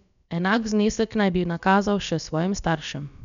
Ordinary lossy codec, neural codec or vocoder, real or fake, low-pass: none; codec, 16 kHz, about 1 kbps, DyCAST, with the encoder's durations; fake; 7.2 kHz